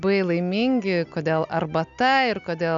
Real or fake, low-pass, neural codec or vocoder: real; 7.2 kHz; none